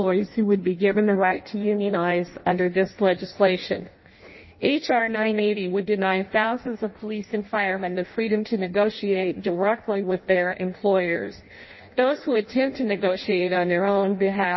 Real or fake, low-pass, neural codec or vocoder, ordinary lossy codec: fake; 7.2 kHz; codec, 16 kHz in and 24 kHz out, 0.6 kbps, FireRedTTS-2 codec; MP3, 24 kbps